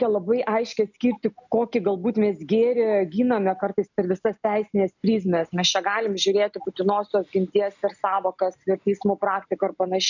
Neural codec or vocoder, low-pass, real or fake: none; 7.2 kHz; real